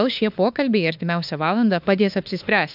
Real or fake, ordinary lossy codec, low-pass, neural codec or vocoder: fake; AAC, 48 kbps; 5.4 kHz; autoencoder, 48 kHz, 32 numbers a frame, DAC-VAE, trained on Japanese speech